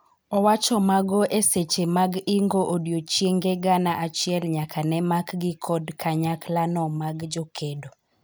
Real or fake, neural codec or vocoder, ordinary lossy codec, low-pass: real; none; none; none